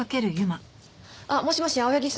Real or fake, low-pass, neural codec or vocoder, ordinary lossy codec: real; none; none; none